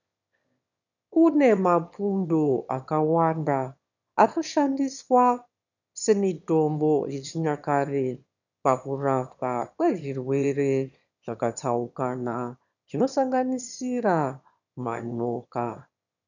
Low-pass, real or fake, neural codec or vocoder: 7.2 kHz; fake; autoencoder, 22.05 kHz, a latent of 192 numbers a frame, VITS, trained on one speaker